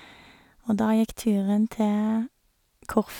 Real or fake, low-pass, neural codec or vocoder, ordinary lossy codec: real; 19.8 kHz; none; none